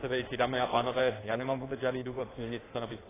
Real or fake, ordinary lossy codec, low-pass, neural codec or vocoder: fake; AAC, 16 kbps; 3.6 kHz; codec, 16 kHz in and 24 kHz out, 1.1 kbps, FireRedTTS-2 codec